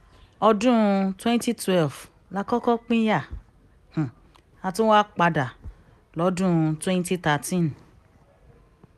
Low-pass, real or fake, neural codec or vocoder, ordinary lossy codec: 14.4 kHz; real; none; none